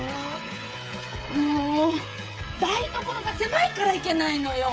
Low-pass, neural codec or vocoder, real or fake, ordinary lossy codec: none; codec, 16 kHz, 16 kbps, FreqCodec, smaller model; fake; none